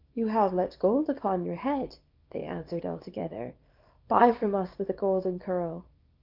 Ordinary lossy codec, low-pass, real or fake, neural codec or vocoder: Opus, 32 kbps; 5.4 kHz; fake; codec, 24 kHz, 0.9 kbps, WavTokenizer, small release